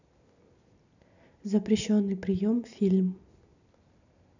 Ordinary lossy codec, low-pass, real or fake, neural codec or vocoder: none; 7.2 kHz; real; none